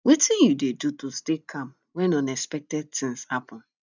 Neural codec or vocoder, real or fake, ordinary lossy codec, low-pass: none; real; none; 7.2 kHz